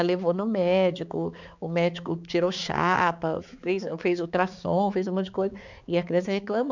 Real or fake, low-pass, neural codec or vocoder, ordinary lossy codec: fake; 7.2 kHz; codec, 16 kHz, 4 kbps, X-Codec, HuBERT features, trained on balanced general audio; none